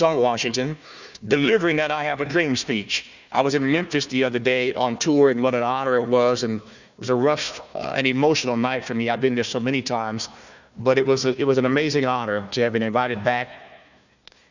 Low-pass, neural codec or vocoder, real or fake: 7.2 kHz; codec, 16 kHz, 1 kbps, FunCodec, trained on Chinese and English, 50 frames a second; fake